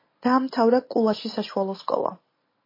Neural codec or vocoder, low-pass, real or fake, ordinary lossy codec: none; 5.4 kHz; real; MP3, 24 kbps